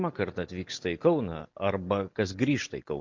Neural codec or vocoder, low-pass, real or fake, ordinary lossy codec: none; 7.2 kHz; real; MP3, 48 kbps